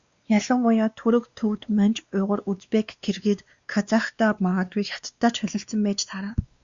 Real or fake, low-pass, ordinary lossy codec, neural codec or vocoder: fake; 7.2 kHz; Opus, 64 kbps; codec, 16 kHz, 2 kbps, X-Codec, WavLM features, trained on Multilingual LibriSpeech